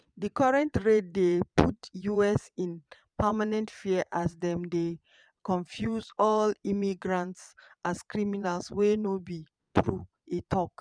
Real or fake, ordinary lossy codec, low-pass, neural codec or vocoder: fake; none; 9.9 kHz; vocoder, 22.05 kHz, 80 mel bands, Vocos